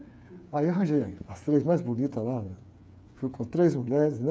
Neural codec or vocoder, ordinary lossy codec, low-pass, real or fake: codec, 16 kHz, 8 kbps, FreqCodec, smaller model; none; none; fake